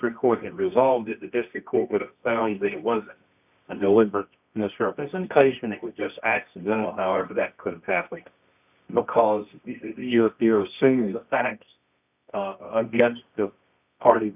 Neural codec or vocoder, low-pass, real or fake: codec, 24 kHz, 0.9 kbps, WavTokenizer, medium music audio release; 3.6 kHz; fake